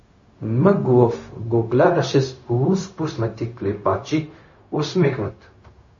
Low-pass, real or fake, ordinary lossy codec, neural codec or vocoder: 7.2 kHz; fake; MP3, 32 kbps; codec, 16 kHz, 0.4 kbps, LongCat-Audio-Codec